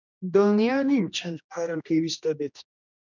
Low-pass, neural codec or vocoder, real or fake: 7.2 kHz; codec, 16 kHz, 1 kbps, X-Codec, HuBERT features, trained on general audio; fake